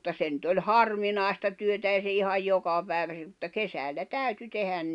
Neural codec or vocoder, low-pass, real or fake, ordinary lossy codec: none; 10.8 kHz; real; none